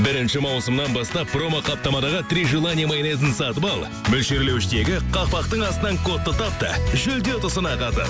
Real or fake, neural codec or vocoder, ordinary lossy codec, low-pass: real; none; none; none